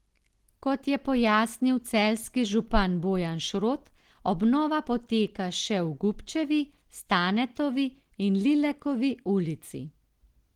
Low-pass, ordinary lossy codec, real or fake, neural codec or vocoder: 19.8 kHz; Opus, 16 kbps; real; none